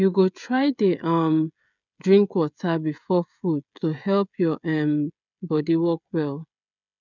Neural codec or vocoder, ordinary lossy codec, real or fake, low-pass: codec, 16 kHz, 16 kbps, FreqCodec, smaller model; none; fake; 7.2 kHz